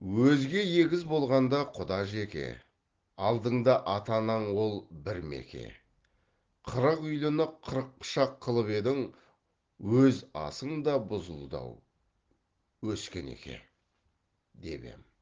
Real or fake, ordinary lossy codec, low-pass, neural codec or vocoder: real; Opus, 16 kbps; 7.2 kHz; none